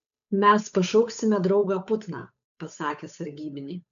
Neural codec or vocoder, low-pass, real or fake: codec, 16 kHz, 8 kbps, FunCodec, trained on Chinese and English, 25 frames a second; 7.2 kHz; fake